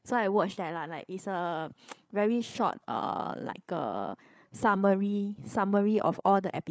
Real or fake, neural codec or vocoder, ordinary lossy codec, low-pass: fake; codec, 16 kHz, 8 kbps, FreqCodec, larger model; none; none